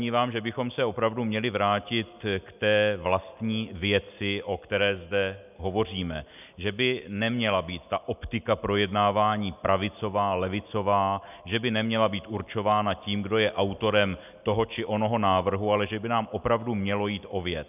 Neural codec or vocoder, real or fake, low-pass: none; real; 3.6 kHz